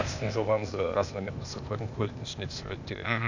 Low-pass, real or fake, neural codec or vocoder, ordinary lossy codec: 7.2 kHz; fake; codec, 16 kHz, 0.8 kbps, ZipCodec; none